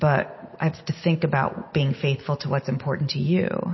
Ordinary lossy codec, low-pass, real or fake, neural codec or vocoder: MP3, 24 kbps; 7.2 kHz; real; none